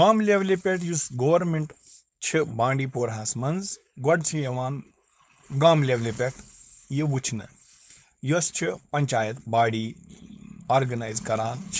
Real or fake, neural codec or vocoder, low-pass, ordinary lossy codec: fake; codec, 16 kHz, 4.8 kbps, FACodec; none; none